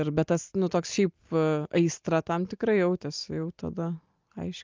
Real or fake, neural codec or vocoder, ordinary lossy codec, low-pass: real; none; Opus, 32 kbps; 7.2 kHz